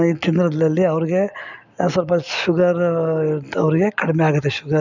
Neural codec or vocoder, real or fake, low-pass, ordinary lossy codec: none; real; 7.2 kHz; none